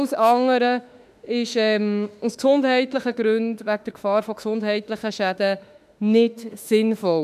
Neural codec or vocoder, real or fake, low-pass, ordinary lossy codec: autoencoder, 48 kHz, 32 numbers a frame, DAC-VAE, trained on Japanese speech; fake; 14.4 kHz; none